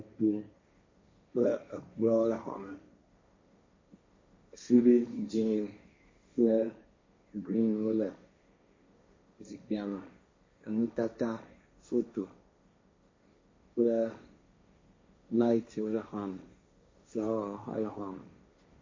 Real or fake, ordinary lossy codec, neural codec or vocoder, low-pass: fake; MP3, 32 kbps; codec, 16 kHz, 1.1 kbps, Voila-Tokenizer; 7.2 kHz